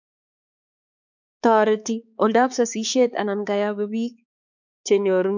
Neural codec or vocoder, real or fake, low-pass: codec, 16 kHz, 4 kbps, X-Codec, HuBERT features, trained on balanced general audio; fake; 7.2 kHz